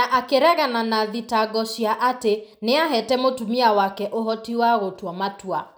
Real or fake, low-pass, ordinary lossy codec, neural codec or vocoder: real; none; none; none